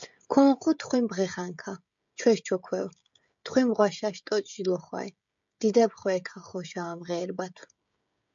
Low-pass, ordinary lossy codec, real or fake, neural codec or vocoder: 7.2 kHz; MP3, 64 kbps; fake; codec, 16 kHz, 16 kbps, FreqCodec, smaller model